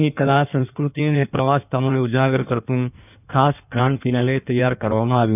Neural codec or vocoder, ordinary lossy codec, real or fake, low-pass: codec, 16 kHz in and 24 kHz out, 1.1 kbps, FireRedTTS-2 codec; none; fake; 3.6 kHz